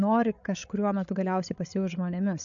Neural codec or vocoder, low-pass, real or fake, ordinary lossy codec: codec, 16 kHz, 16 kbps, FreqCodec, larger model; 7.2 kHz; fake; AAC, 64 kbps